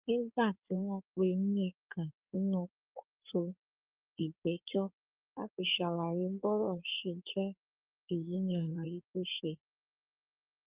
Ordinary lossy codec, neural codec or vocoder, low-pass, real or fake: Opus, 32 kbps; codec, 16 kHz in and 24 kHz out, 2.2 kbps, FireRedTTS-2 codec; 3.6 kHz; fake